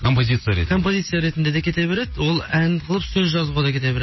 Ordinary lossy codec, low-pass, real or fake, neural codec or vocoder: MP3, 24 kbps; 7.2 kHz; real; none